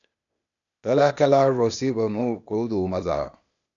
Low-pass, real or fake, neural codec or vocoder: 7.2 kHz; fake; codec, 16 kHz, 0.8 kbps, ZipCodec